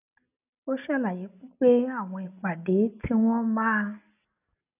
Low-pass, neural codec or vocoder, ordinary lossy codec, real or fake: 3.6 kHz; none; none; real